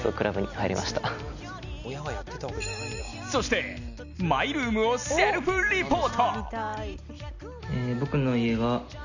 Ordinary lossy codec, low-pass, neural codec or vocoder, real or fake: none; 7.2 kHz; none; real